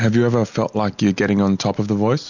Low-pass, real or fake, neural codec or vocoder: 7.2 kHz; real; none